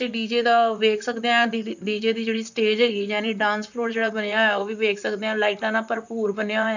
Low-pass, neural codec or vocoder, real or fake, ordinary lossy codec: 7.2 kHz; vocoder, 44.1 kHz, 128 mel bands, Pupu-Vocoder; fake; none